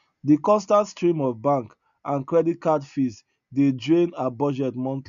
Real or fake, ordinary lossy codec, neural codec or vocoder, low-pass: real; none; none; 7.2 kHz